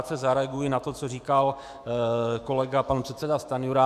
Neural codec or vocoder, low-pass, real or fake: autoencoder, 48 kHz, 128 numbers a frame, DAC-VAE, trained on Japanese speech; 14.4 kHz; fake